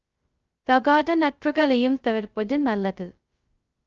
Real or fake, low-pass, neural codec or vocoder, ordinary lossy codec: fake; 7.2 kHz; codec, 16 kHz, 0.2 kbps, FocalCodec; Opus, 24 kbps